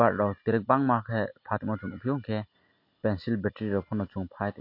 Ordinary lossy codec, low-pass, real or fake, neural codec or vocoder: MP3, 32 kbps; 5.4 kHz; real; none